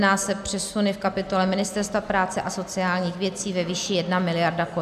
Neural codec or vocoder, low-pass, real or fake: none; 14.4 kHz; real